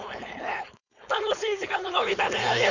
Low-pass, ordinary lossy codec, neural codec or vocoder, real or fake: 7.2 kHz; none; codec, 16 kHz, 4.8 kbps, FACodec; fake